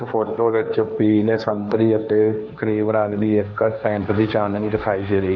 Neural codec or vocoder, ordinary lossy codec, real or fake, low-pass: codec, 16 kHz, 1.1 kbps, Voila-Tokenizer; none; fake; 7.2 kHz